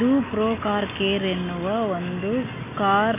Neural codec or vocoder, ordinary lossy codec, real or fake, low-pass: none; none; real; 3.6 kHz